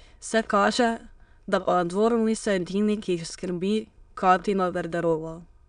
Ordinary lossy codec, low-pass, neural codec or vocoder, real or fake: MP3, 96 kbps; 9.9 kHz; autoencoder, 22.05 kHz, a latent of 192 numbers a frame, VITS, trained on many speakers; fake